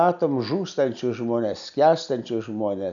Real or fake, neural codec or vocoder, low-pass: real; none; 7.2 kHz